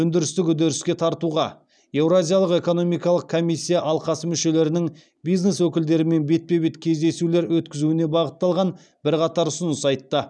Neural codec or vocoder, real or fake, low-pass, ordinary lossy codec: none; real; 9.9 kHz; none